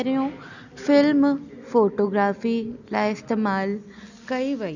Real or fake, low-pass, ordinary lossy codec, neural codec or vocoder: real; 7.2 kHz; none; none